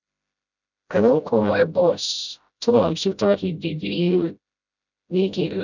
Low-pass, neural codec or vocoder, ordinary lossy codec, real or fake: 7.2 kHz; codec, 16 kHz, 0.5 kbps, FreqCodec, smaller model; none; fake